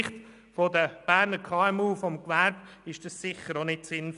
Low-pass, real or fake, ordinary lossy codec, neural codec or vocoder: 10.8 kHz; real; none; none